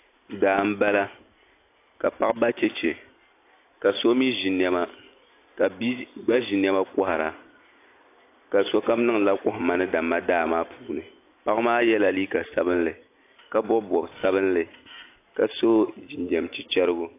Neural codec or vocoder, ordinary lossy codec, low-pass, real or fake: none; AAC, 24 kbps; 3.6 kHz; real